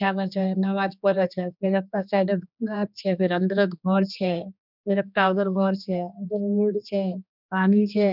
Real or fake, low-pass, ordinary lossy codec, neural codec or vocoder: fake; 5.4 kHz; none; codec, 16 kHz, 2 kbps, X-Codec, HuBERT features, trained on general audio